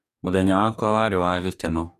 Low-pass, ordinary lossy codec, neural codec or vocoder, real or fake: 14.4 kHz; none; codec, 44.1 kHz, 2.6 kbps, DAC; fake